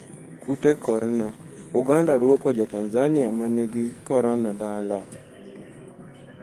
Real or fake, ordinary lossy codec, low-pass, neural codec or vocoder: fake; Opus, 32 kbps; 14.4 kHz; codec, 44.1 kHz, 2.6 kbps, SNAC